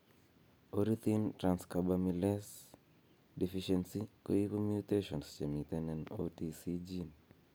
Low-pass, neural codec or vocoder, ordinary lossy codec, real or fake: none; none; none; real